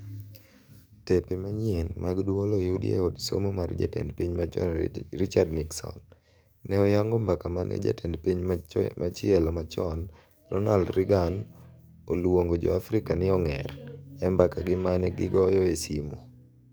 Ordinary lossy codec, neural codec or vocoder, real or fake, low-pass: none; codec, 44.1 kHz, 7.8 kbps, DAC; fake; none